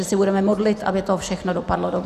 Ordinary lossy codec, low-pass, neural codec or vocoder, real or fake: Opus, 64 kbps; 14.4 kHz; none; real